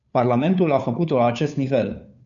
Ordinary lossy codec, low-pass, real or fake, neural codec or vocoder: MP3, 96 kbps; 7.2 kHz; fake; codec, 16 kHz, 2 kbps, FunCodec, trained on Chinese and English, 25 frames a second